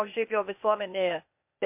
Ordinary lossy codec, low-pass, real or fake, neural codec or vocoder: MP3, 32 kbps; 3.6 kHz; fake; codec, 16 kHz, 0.8 kbps, ZipCodec